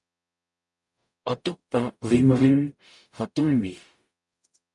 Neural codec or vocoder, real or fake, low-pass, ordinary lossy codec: codec, 44.1 kHz, 0.9 kbps, DAC; fake; 10.8 kHz; MP3, 96 kbps